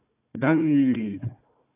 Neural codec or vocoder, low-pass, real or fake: codec, 16 kHz, 1 kbps, FunCodec, trained on Chinese and English, 50 frames a second; 3.6 kHz; fake